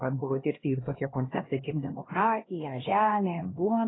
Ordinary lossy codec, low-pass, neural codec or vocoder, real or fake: AAC, 16 kbps; 7.2 kHz; codec, 16 kHz, 1 kbps, X-Codec, HuBERT features, trained on LibriSpeech; fake